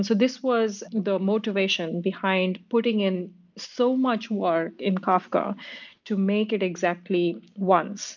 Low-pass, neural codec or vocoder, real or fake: 7.2 kHz; none; real